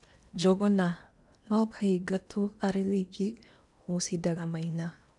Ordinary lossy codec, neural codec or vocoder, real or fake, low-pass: none; codec, 16 kHz in and 24 kHz out, 0.8 kbps, FocalCodec, streaming, 65536 codes; fake; 10.8 kHz